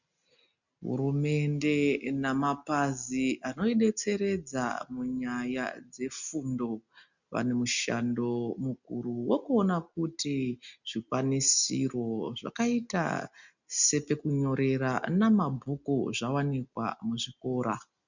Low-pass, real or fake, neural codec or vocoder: 7.2 kHz; real; none